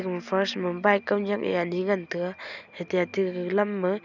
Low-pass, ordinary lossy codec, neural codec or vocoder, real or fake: 7.2 kHz; none; none; real